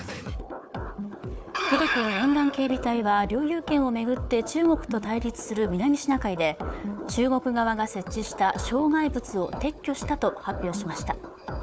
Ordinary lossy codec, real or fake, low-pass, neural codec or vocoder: none; fake; none; codec, 16 kHz, 4 kbps, FunCodec, trained on Chinese and English, 50 frames a second